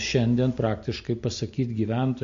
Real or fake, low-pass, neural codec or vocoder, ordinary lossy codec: real; 7.2 kHz; none; MP3, 48 kbps